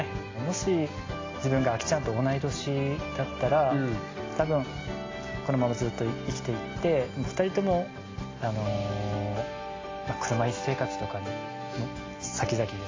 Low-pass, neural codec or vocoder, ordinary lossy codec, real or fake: 7.2 kHz; none; AAC, 32 kbps; real